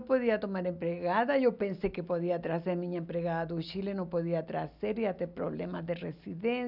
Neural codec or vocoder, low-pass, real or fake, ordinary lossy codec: none; 5.4 kHz; real; none